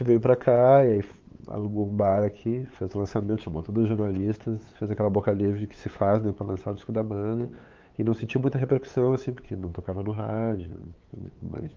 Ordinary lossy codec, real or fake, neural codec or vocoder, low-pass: Opus, 32 kbps; fake; codec, 16 kHz, 8 kbps, FunCodec, trained on LibriTTS, 25 frames a second; 7.2 kHz